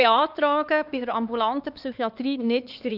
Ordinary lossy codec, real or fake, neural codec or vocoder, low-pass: none; fake; codec, 16 kHz, 4 kbps, X-Codec, HuBERT features, trained on LibriSpeech; 5.4 kHz